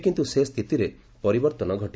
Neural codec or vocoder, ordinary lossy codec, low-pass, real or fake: none; none; none; real